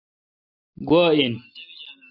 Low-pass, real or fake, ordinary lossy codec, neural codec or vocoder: 5.4 kHz; real; AAC, 24 kbps; none